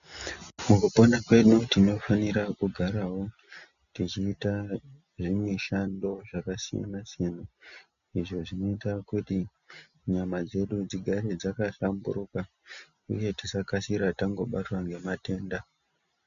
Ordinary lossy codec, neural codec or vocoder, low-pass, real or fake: MP3, 96 kbps; none; 7.2 kHz; real